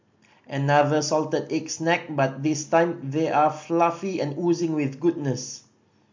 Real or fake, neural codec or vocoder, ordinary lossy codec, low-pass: real; none; MP3, 48 kbps; 7.2 kHz